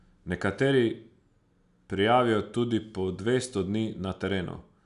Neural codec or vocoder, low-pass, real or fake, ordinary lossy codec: none; 10.8 kHz; real; none